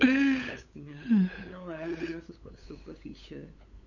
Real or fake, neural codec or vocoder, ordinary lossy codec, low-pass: fake; codec, 16 kHz, 8 kbps, FunCodec, trained on LibriTTS, 25 frames a second; none; 7.2 kHz